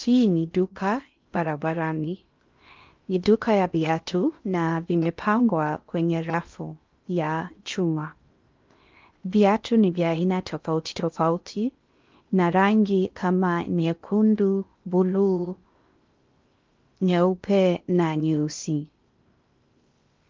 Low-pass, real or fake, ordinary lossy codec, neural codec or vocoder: 7.2 kHz; fake; Opus, 32 kbps; codec, 16 kHz in and 24 kHz out, 0.6 kbps, FocalCodec, streaming, 4096 codes